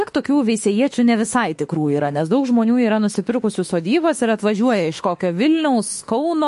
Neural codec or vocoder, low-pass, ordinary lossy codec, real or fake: autoencoder, 48 kHz, 32 numbers a frame, DAC-VAE, trained on Japanese speech; 14.4 kHz; MP3, 48 kbps; fake